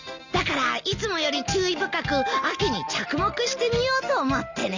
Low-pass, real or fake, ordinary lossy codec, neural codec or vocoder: 7.2 kHz; real; none; none